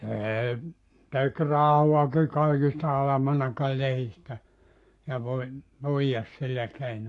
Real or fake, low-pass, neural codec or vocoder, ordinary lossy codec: fake; 10.8 kHz; codec, 44.1 kHz, 7.8 kbps, Pupu-Codec; AAC, 48 kbps